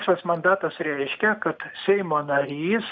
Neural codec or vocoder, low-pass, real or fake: none; 7.2 kHz; real